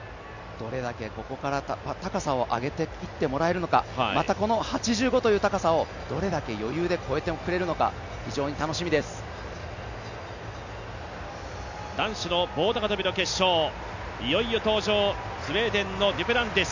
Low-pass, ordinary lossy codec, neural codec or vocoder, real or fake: 7.2 kHz; none; none; real